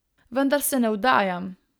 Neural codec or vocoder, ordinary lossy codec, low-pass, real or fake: none; none; none; real